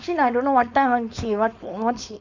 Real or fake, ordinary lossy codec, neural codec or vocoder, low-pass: fake; none; codec, 16 kHz, 4.8 kbps, FACodec; 7.2 kHz